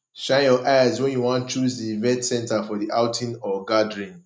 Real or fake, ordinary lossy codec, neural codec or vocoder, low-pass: real; none; none; none